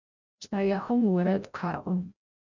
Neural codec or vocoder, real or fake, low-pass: codec, 16 kHz, 0.5 kbps, FreqCodec, larger model; fake; 7.2 kHz